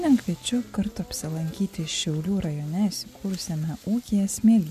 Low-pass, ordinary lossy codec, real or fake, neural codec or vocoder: 14.4 kHz; MP3, 64 kbps; real; none